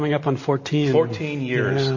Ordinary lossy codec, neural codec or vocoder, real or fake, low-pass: MP3, 32 kbps; none; real; 7.2 kHz